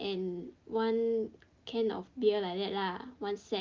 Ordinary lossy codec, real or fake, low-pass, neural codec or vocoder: Opus, 32 kbps; real; 7.2 kHz; none